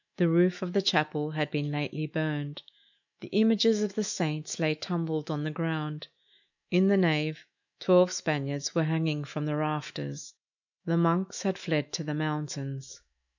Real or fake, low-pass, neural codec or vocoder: fake; 7.2 kHz; autoencoder, 48 kHz, 128 numbers a frame, DAC-VAE, trained on Japanese speech